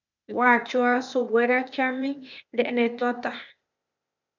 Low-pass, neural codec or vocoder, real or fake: 7.2 kHz; codec, 16 kHz, 0.8 kbps, ZipCodec; fake